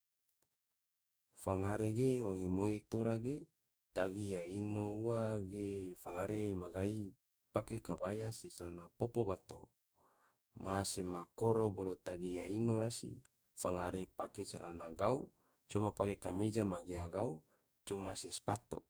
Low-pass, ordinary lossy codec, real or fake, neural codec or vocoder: none; none; fake; codec, 44.1 kHz, 2.6 kbps, DAC